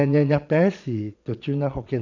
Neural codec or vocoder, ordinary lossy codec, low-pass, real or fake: vocoder, 22.05 kHz, 80 mel bands, Vocos; none; 7.2 kHz; fake